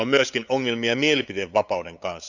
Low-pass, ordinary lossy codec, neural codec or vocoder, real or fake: 7.2 kHz; none; codec, 16 kHz, 8 kbps, FunCodec, trained on LibriTTS, 25 frames a second; fake